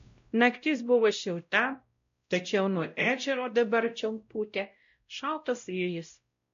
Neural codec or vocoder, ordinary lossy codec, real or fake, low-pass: codec, 16 kHz, 0.5 kbps, X-Codec, WavLM features, trained on Multilingual LibriSpeech; MP3, 48 kbps; fake; 7.2 kHz